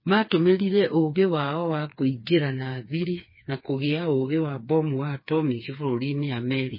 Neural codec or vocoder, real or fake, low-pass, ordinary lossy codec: codec, 16 kHz, 4 kbps, FreqCodec, smaller model; fake; 5.4 kHz; MP3, 24 kbps